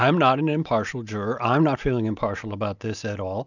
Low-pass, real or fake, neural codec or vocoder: 7.2 kHz; real; none